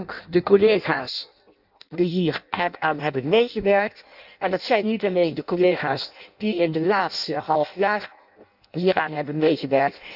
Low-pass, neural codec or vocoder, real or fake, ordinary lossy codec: 5.4 kHz; codec, 16 kHz in and 24 kHz out, 0.6 kbps, FireRedTTS-2 codec; fake; none